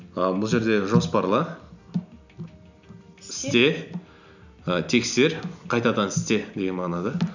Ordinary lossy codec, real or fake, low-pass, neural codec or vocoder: none; real; 7.2 kHz; none